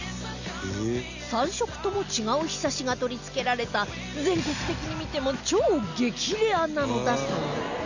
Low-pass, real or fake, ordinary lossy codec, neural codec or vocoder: 7.2 kHz; real; none; none